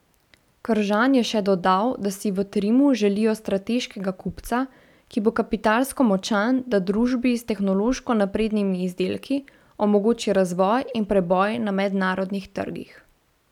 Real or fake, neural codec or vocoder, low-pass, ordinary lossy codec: real; none; 19.8 kHz; none